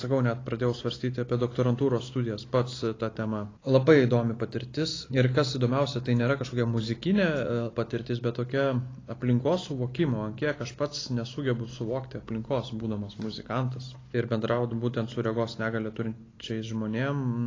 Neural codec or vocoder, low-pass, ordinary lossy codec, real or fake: none; 7.2 kHz; AAC, 32 kbps; real